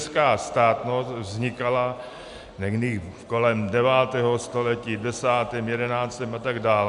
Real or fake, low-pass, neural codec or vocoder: real; 10.8 kHz; none